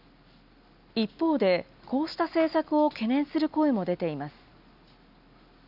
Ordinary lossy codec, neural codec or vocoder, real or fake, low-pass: none; none; real; 5.4 kHz